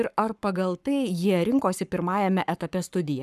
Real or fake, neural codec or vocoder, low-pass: fake; codec, 44.1 kHz, 7.8 kbps, Pupu-Codec; 14.4 kHz